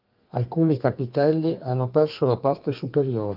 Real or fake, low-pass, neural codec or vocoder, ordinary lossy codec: fake; 5.4 kHz; codec, 32 kHz, 1.9 kbps, SNAC; Opus, 24 kbps